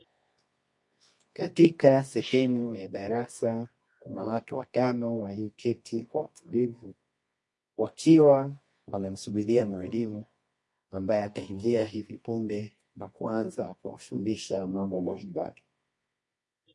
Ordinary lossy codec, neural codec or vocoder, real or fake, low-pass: MP3, 48 kbps; codec, 24 kHz, 0.9 kbps, WavTokenizer, medium music audio release; fake; 10.8 kHz